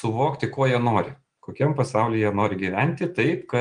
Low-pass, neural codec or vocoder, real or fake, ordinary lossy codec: 9.9 kHz; none; real; Opus, 32 kbps